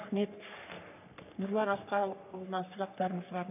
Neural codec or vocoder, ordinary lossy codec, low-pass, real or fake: codec, 44.1 kHz, 3.4 kbps, Pupu-Codec; none; 3.6 kHz; fake